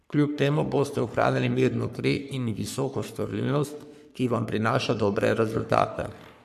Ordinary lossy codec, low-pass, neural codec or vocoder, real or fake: none; 14.4 kHz; codec, 44.1 kHz, 3.4 kbps, Pupu-Codec; fake